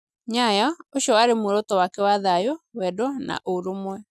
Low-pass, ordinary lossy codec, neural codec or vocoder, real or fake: none; none; none; real